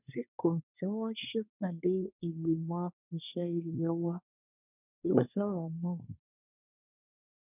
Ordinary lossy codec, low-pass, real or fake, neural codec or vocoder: none; 3.6 kHz; fake; codec, 24 kHz, 1 kbps, SNAC